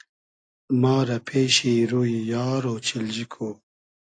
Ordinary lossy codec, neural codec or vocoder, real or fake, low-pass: AAC, 48 kbps; none; real; 9.9 kHz